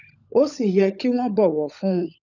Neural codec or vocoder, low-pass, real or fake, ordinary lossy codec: codec, 16 kHz, 16 kbps, FunCodec, trained on LibriTTS, 50 frames a second; 7.2 kHz; fake; none